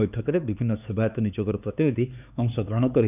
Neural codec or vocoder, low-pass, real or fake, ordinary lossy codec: codec, 16 kHz, 4 kbps, X-Codec, HuBERT features, trained on LibriSpeech; 3.6 kHz; fake; none